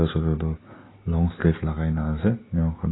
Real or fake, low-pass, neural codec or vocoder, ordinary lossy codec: real; 7.2 kHz; none; AAC, 16 kbps